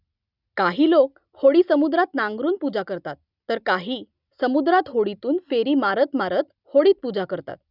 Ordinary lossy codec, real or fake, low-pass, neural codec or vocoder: none; real; 5.4 kHz; none